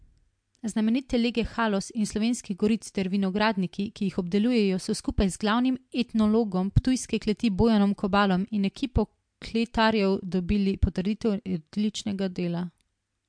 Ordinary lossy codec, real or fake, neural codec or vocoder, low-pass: MP3, 64 kbps; real; none; 9.9 kHz